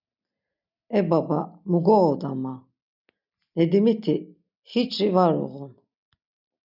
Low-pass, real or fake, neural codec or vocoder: 5.4 kHz; real; none